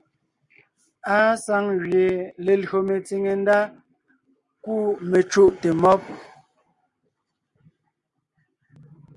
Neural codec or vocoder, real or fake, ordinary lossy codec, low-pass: none; real; Opus, 64 kbps; 10.8 kHz